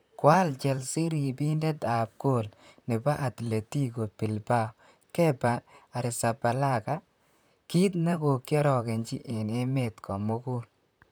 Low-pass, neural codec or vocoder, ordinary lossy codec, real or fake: none; vocoder, 44.1 kHz, 128 mel bands, Pupu-Vocoder; none; fake